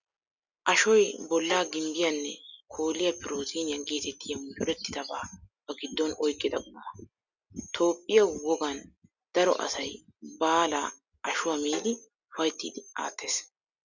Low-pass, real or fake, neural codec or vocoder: 7.2 kHz; real; none